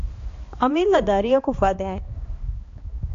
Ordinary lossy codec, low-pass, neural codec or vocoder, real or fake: AAC, 48 kbps; 7.2 kHz; codec, 16 kHz, 2 kbps, X-Codec, HuBERT features, trained on balanced general audio; fake